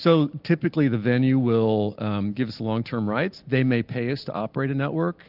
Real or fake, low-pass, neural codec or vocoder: real; 5.4 kHz; none